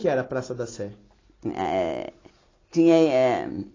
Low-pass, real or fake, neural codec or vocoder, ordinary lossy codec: 7.2 kHz; real; none; AAC, 32 kbps